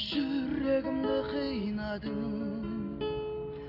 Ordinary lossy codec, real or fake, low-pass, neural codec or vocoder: none; real; 5.4 kHz; none